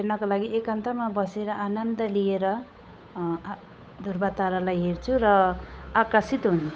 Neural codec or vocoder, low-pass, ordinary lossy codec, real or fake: codec, 16 kHz, 8 kbps, FunCodec, trained on Chinese and English, 25 frames a second; none; none; fake